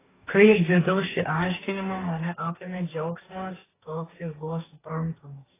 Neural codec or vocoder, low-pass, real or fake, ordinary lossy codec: codec, 44.1 kHz, 2.6 kbps, DAC; 3.6 kHz; fake; AAC, 16 kbps